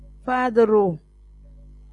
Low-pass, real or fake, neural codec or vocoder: 10.8 kHz; real; none